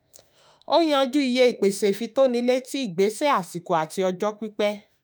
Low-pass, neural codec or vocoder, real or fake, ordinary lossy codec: none; autoencoder, 48 kHz, 32 numbers a frame, DAC-VAE, trained on Japanese speech; fake; none